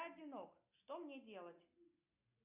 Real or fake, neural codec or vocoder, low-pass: real; none; 3.6 kHz